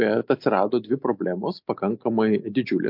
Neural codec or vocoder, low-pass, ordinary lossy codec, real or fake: none; 5.4 kHz; MP3, 48 kbps; real